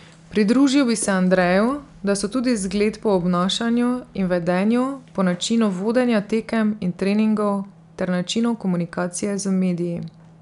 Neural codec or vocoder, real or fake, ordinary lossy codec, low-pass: none; real; none; 10.8 kHz